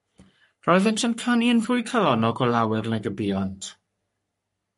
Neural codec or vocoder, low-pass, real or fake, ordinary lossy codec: codec, 44.1 kHz, 3.4 kbps, Pupu-Codec; 14.4 kHz; fake; MP3, 48 kbps